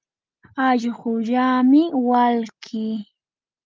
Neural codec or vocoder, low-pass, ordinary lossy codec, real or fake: none; 7.2 kHz; Opus, 24 kbps; real